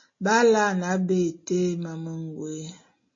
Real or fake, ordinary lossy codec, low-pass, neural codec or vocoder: real; MP3, 32 kbps; 7.2 kHz; none